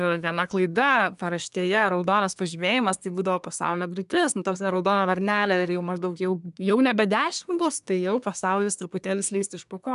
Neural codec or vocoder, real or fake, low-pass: codec, 24 kHz, 1 kbps, SNAC; fake; 10.8 kHz